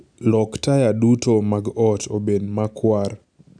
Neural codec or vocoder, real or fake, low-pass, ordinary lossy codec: none; real; 9.9 kHz; none